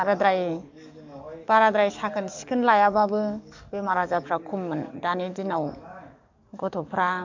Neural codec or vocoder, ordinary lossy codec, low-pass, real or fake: codec, 44.1 kHz, 7.8 kbps, DAC; MP3, 64 kbps; 7.2 kHz; fake